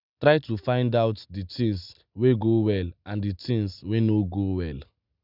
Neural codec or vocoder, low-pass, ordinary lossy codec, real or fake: none; 5.4 kHz; none; real